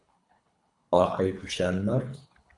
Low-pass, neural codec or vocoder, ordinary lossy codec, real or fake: 10.8 kHz; codec, 24 kHz, 3 kbps, HILCodec; AAC, 64 kbps; fake